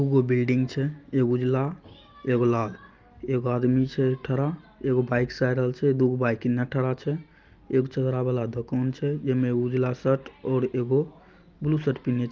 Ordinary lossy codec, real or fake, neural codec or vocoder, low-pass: Opus, 32 kbps; real; none; 7.2 kHz